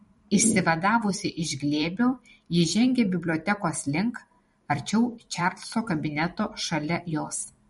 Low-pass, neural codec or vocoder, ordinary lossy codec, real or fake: 19.8 kHz; vocoder, 44.1 kHz, 128 mel bands every 256 samples, BigVGAN v2; MP3, 48 kbps; fake